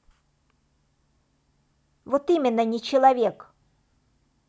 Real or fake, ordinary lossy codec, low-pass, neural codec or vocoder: real; none; none; none